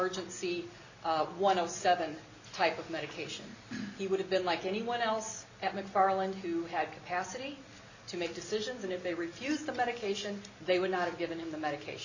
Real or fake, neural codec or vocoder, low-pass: real; none; 7.2 kHz